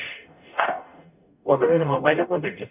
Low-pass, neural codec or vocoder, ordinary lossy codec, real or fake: 3.6 kHz; codec, 44.1 kHz, 0.9 kbps, DAC; none; fake